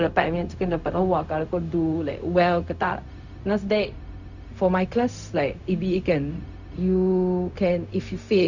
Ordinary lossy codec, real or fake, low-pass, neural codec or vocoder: none; fake; 7.2 kHz; codec, 16 kHz, 0.4 kbps, LongCat-Audio-Codec